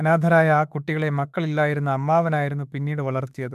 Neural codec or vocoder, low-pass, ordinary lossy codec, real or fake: autoencoder, 48 kHz, 32 numbers a frame, DAC-VAE, trained on Japanese speech; 14.4 kHz; MP3, 96 kbps; fake